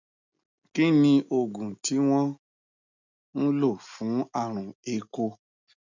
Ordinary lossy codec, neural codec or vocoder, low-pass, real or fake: none; none; 7.2 kHz; real